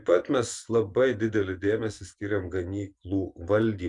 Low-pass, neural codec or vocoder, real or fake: 10.8 kHz; vocoder, 24 kHz, 100 mel bands, Vocos; fake